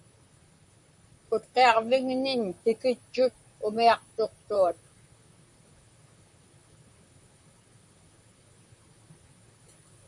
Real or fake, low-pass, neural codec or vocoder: fake; 10.8 kHz; vocoder, 44.1 kHz, 128 mel bands, Pupu-Vocoder